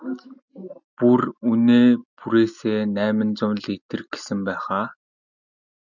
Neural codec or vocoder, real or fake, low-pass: none; real; 7.2 kHz